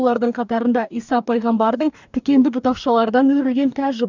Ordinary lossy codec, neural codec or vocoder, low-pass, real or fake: none; codec, 44.1 kHz, 2.6 kbps, DAC; 7.2 kHz; fake